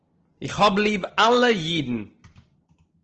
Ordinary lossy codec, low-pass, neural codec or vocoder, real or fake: Opus, 16 kbps; 7.2 kHz; none; real